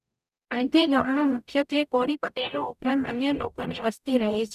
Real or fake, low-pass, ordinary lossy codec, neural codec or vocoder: fake; 14.4 kHz; none; codec, 44.1 kHz, 0.9 kbps, DAC